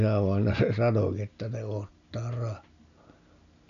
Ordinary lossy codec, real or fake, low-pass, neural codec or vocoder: none; real; 7.2 kHz; none